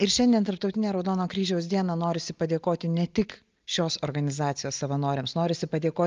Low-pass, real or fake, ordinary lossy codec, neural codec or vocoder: 7.2 kHz; real; Opus, 32 kbps; none